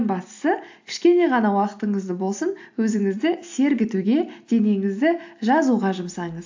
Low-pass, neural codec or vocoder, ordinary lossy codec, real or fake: 7.2 kHz; none; AAC, 48 kbps; real